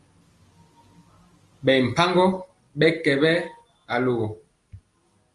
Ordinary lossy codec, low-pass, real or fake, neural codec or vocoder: Opus, 32 kbps; 10.8 kHz; real; none